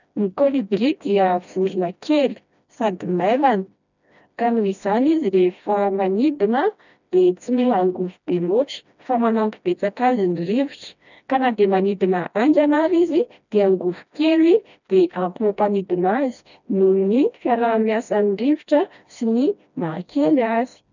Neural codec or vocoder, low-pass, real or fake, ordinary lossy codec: codec, 16 kHz, 1 kbps, FreqCodec, smaller model; 7.2 kHz; fake; none